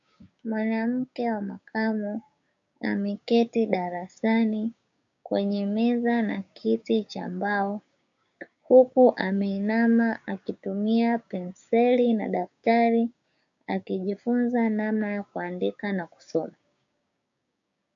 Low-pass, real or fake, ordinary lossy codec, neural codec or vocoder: 7.2 kHz; fake; AAC, 64 kbps; codec, 16 kHz, 6 kbps, DAC